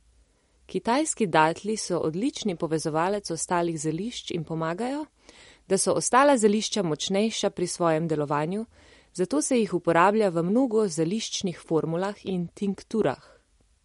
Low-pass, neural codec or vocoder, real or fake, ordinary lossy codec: 19.8 kHz; none; real; MP3, 48 kbps